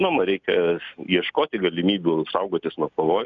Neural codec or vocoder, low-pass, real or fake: none; 7.2 kHz; real